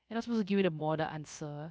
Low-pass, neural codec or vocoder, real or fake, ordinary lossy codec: none; codec, 16 kHz, 0.3 kbps, FocalCodec; fake; none